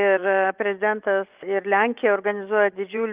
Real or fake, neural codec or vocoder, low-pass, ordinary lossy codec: fake; vocoder, 24 kHz, 100 mel bands, Vocos; 3.6 kHz; Opus, 32 kbps